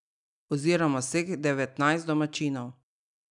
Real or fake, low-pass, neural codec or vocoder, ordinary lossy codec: real; 10.8 kHz; none; none